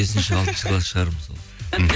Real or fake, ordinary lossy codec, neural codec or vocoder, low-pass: real; none; none; none